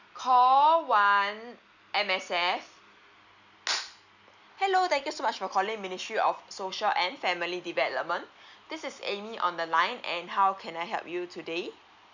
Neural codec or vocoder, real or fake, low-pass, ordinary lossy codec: none; real; 7.2 kHz; none